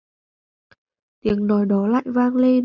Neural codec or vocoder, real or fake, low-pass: none; real; 7.2 kHz